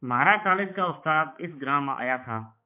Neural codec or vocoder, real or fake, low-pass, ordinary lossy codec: codec, 16 kHz, 4 kbps, X-Codec, HuBERT features, trained on balanced general audio; fake; 3.6 kHz; MP3, 32 kbps